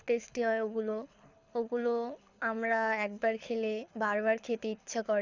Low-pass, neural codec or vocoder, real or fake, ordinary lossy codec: 7.2 kHz; codec, 24 kHz, 6 kbps, HILCodec; fake; none